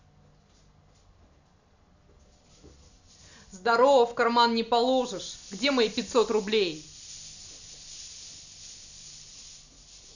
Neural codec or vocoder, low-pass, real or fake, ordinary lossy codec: none; 7.2 kHz; real; none